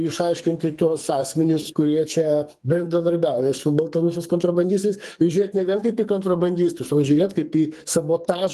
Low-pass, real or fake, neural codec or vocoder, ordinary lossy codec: 14.4 kHz; fake; codec, 44.1 kHz, 2.6 kbps, SNAC; Opus, 32 kbps